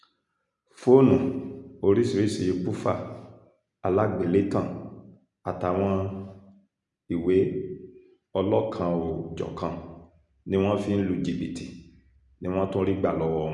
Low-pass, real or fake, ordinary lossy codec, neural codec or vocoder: 10.8 kHz; real; none; none